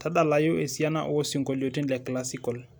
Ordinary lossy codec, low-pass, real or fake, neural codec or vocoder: none; none; real; none